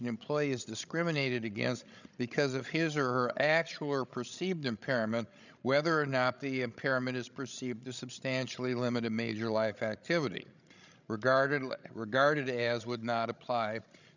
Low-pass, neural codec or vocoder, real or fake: 7.2 kHz; codec, 16 kHz, 16 kbps, FreqCodec, larger model; fake